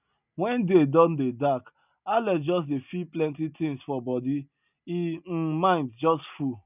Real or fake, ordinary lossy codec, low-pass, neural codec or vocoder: real; none; 3.6 kHz; none